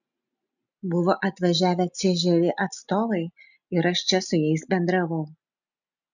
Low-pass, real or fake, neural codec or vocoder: 7.2 kHz; real; none